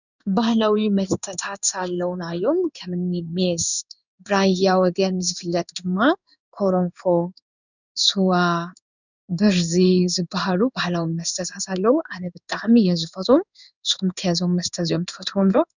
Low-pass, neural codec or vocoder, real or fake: 7.2 kHz; codec, 16 kHz in and 24 kHz out, 1 kbps, XY-Tokenizer; fake